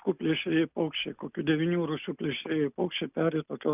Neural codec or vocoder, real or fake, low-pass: none; real; 3.6 kHz